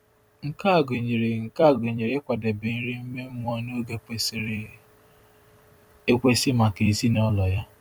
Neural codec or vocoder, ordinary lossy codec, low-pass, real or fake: vocoder, 44.1 kHz, 128 mel bands every 256 samples, BigVGAN v2; none; 19.8 kHz; fake